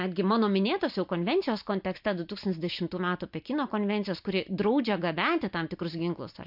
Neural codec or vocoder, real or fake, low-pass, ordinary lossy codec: none; real; 5.4 kHz; MP3, 48 kbps